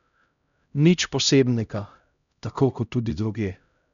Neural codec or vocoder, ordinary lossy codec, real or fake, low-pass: codec, 16 kHz, 0.5 kbps, X-Codec, HuBERT features, trained on LibriSpeech; none; fake; 7.2 kHz